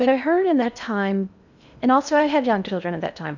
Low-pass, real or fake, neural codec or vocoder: 7.2 kHz; fake; codec, 16 kHz in and 24 kHz out, 0.6 kbps, FocalCodec, streaming, 2048 codes